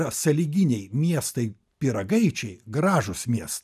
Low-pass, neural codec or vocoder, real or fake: 14.4 kHz; none; real